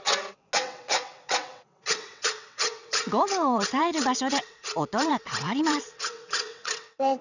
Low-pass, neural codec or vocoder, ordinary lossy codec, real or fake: 7.2 kHz; vocoder, 22.05 kHz, 80 mel bands, WaveNeXt; none; fake